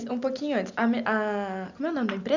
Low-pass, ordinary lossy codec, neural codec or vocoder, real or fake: 7.2 kHz; Opus, 64 kbps; none; real